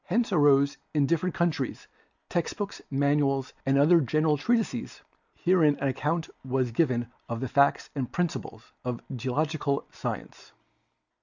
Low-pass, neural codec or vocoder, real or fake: 7.2 kHz; none; real